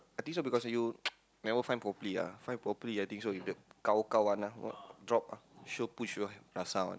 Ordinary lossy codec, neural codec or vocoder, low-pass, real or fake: none; none; none; real